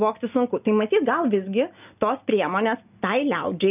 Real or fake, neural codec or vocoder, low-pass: real; none; 3.6 kHz